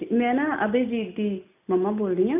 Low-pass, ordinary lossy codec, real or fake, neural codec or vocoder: 3.6 kHz; none; real; none